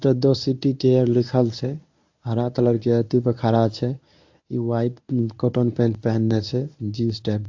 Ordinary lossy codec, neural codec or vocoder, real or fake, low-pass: AAC, 48 kbps; codec, 24 kHz, 0.9 kbps, WavTokenizer, medium speech release version 1; fake; 7.2 kHz